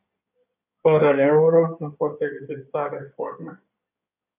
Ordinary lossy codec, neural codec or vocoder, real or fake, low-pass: AAC, 32 kbps; codec, 16 kHz in and 24 kHz out, 2.2 kbps, FireRedTTS-2 codec; fake; 3.6 kHz